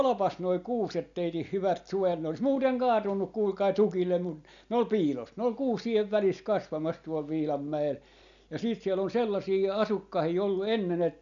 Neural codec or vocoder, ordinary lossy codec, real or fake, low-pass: none; none; real; 7.2 kHz